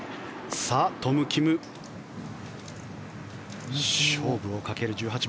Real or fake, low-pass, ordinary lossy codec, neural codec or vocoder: real; none; none; none